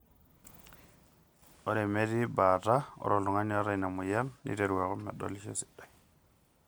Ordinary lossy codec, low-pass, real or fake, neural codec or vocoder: none; none; real; none